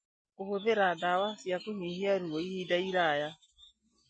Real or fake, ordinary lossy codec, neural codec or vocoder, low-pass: real; MP3, 32 kbps; none; 9.9 kHz